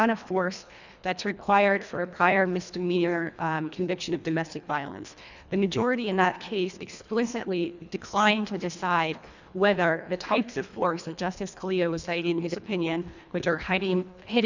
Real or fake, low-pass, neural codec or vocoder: fake; 7.2 kHz; codec, 24 kHz, 1.5 kbps, HILCodec